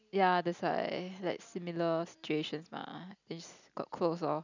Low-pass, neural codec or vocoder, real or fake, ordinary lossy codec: 7.2 kHz; none; real; none